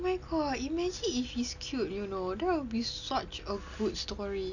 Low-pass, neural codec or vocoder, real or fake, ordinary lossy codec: 7.2 kHz; none; real; none